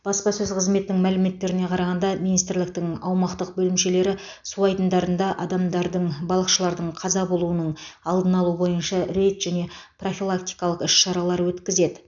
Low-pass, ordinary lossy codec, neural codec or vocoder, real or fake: 7.2 kHz; none; none; real